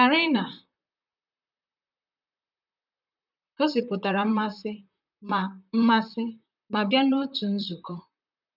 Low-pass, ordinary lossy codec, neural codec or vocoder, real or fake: 5.4 kHz; none; vocoder, 44.1 kHz, 128 mel bands, Pupu-Vocoder; fake